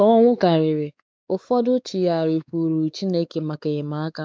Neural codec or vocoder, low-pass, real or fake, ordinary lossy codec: codec, 16 kHz, 4 kbps, X-Codec, WavLM features, trained on Multilingual LibriSpeech; none; fake; none